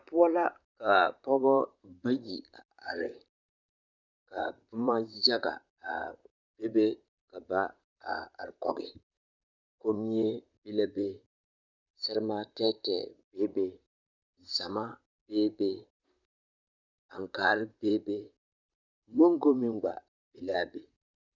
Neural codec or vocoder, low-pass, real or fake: vocoder, 44.1 kHz, 128 mel bands, Pupu-Vocoder; 7.2 kHz; fake